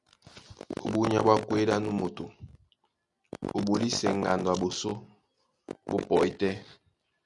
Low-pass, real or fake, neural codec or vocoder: 10.8 kHz; real; none